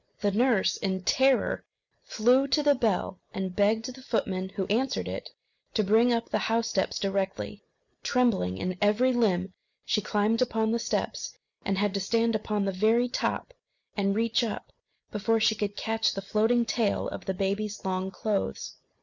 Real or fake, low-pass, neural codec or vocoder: real; 7.2 kHz; none